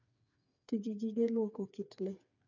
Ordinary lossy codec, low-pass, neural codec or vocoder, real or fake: none; 7.2 kHz; codec, 16 kHz, 4 kbps, FreqCodec, smaller model; fake